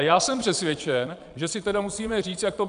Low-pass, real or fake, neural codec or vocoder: 9.9 kHz; fake; vocoder, 22.05 kHz, 80 mel bands, Vocos